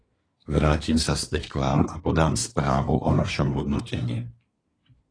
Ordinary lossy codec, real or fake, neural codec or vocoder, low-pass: AAC, 32 kbps; fake; codec, 24 kHz, 1 kbps, SNAC; 9.9 kHz